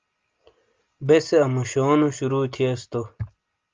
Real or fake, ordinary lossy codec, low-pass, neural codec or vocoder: real; Opus, 32 kbps; 7.2 kHz; none